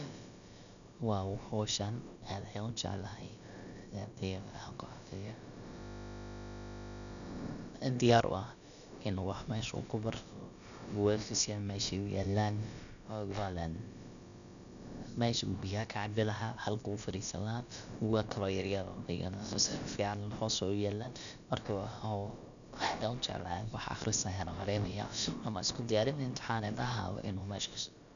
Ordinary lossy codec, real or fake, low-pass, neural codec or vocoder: none; fake; 7.2 kHz; codec, 16 kHz, about 1 kbps, DyCAST, with the encoder's durations